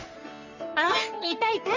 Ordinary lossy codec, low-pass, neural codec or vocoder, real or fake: none; 7.2 kHz; codec, 44.1 kHz, 3.4 kbps, Pupu-Codec; fake